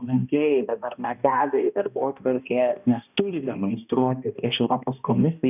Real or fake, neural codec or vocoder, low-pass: fake; codec, 16 kHz, 1 kbps, X-Codec, HuBERT features, trained on balanced general audio; 3.6 kHz